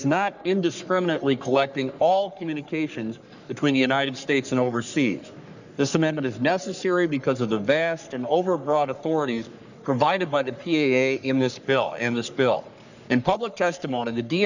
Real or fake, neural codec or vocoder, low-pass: fake; codec, 44.1 kHz, 3.4 kbps, Pupu-Codec; 7.2 kHz